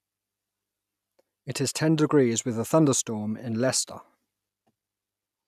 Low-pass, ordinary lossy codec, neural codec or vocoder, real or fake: 14.4 kHz; none; none; real